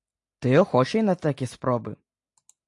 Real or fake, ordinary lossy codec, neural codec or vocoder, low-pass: real; AAC, 64 kbps; none; 10.8 kHz